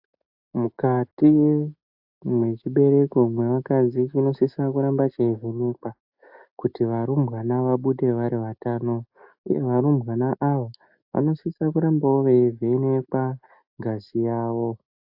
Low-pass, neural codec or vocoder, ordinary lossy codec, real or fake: 5.4 kHz; none; AAC, 48 kbps; real